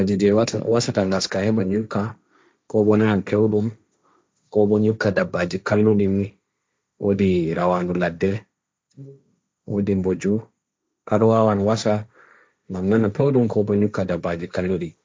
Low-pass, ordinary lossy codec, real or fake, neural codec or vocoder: none; none; fake; codec, 16 kHz, 1.1 kbps, Voila-Tokenizer